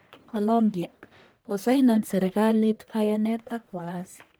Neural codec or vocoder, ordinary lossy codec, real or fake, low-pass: codec, 44.1 kHz, 1.7 kbps, Pupu-Codec; none; fake; none